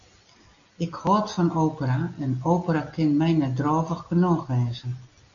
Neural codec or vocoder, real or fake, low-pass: none; real; 7.2 kHz